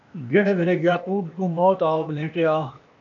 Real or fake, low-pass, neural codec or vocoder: fake; 7.2 kHz; codec, 16 kHz, 0.8 kbps, ZipCodec